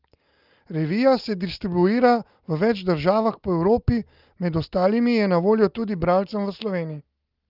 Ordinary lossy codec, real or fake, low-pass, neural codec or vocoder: Opus, 32 kbps; real; 5.4 kHz; none